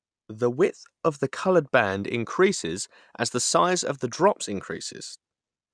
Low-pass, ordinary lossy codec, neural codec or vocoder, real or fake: 9.9 kHz; none; none; real